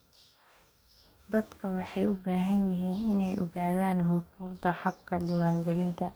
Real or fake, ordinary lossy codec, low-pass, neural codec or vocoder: fake; none; none; codec, 44.1 kHz, 2.6 kbps, DAC